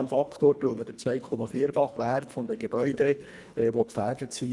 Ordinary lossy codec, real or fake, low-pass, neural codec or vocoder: none; fake; none; codec, 24 kHz, 1.5 kbps, HILCodec